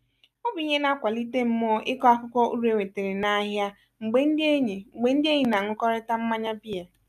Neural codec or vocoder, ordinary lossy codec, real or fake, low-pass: none; none; real; 14.4 kHz